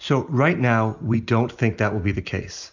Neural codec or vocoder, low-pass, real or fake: none; 7.2 kHz; real